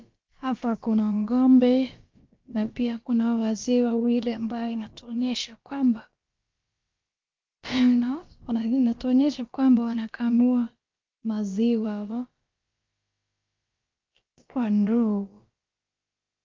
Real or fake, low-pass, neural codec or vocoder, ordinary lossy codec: fake; 7.2 kHz; codec, 16 kHz, about 1 kbps, DyCAST, with the encoder's durations; Opus, 24 kbps